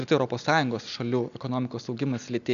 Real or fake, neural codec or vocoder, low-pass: real; none; 7.2 kHz